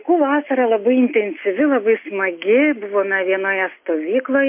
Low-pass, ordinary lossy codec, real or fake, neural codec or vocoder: 3.6 kHz; MP3, 24 kbps; real; none